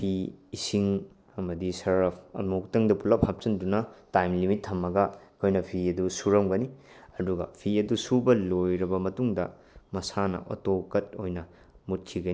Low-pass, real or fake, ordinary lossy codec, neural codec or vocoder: none; real; none; none